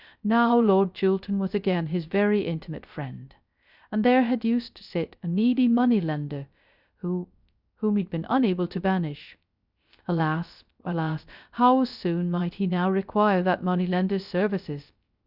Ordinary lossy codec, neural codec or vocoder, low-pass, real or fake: Opus, 64 kbps; codec, 16 kHz, 0.3 kbps, FocalCodec; 5.4 kHz; fake